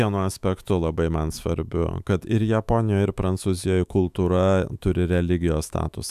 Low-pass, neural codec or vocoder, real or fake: 14.4 kHz; autoencoder, 48 kHz, 128 numbers a frame, DAC-VAE, trained on Japanese speech; fake